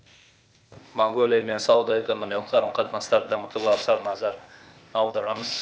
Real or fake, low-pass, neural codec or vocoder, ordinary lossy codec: fake; none; codec, 16 kHz, 0.8 kbps, ZipCodec; none